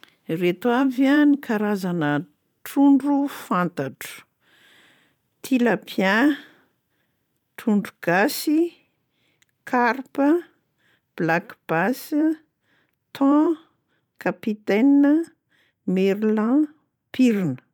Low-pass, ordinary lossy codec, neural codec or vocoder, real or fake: 19.8 kHz; MP3, 96 kbps; vocoder, 44.1 kHz, 128 mel bands every 512 samples, BigVGAN v2; fake